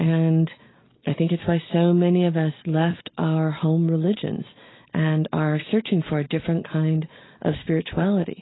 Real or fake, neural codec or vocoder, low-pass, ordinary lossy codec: real; none; 7.2 kHz; AAC, 16 kbps